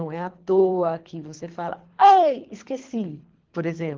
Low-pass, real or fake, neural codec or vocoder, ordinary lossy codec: 7.2 kHz; fake; codec, 24 kHz, 3 kbps, HILCodec; Opus, 16 kbps